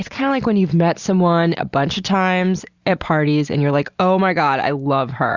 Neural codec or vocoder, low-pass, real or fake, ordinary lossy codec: none; 7.2 kHz; real; Opus, 64 kbps